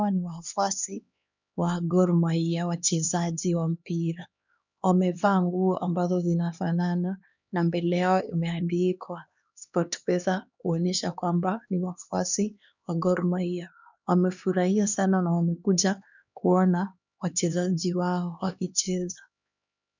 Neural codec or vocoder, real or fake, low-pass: codec, 16 kHz, 2 kbps, X-Codec, HuBERT features, trained on LibriSpeech; fake; 7.2 kHz